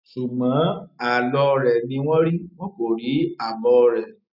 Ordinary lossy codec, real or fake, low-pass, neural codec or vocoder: none; real; 5.4 kHz; none